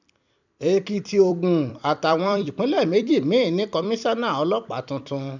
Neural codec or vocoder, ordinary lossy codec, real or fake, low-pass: vocoder, 44.1 kHz, 128 mel bands every 256 samples, BigVGAN v2; none; fake; 7.2 kHz